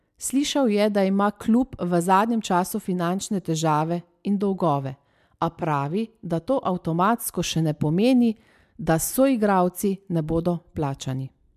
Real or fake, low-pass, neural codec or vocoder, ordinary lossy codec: real; 14.4 kHz; none; MP3, 96 kbps